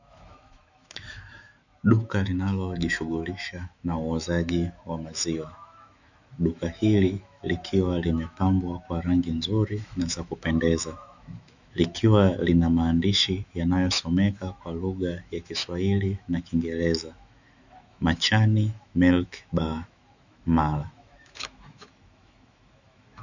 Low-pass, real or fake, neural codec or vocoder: 7.2 kHz; fake; autoencoder, 48 kHz, 128 numbers a frame, DAC-VAE, trained on Japanese speech